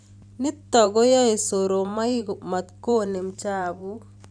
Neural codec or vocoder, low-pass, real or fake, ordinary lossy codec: vocoder, 44.1 kHz, 128 mel bands every 512 samples, BigVGAN v2; 9.9 kHz; fake; none